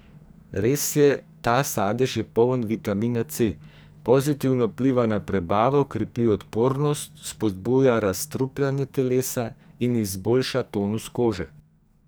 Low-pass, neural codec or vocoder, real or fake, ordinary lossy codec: none; codec, 44.1 kHz, 2.6 kbps, SNAC; fake; none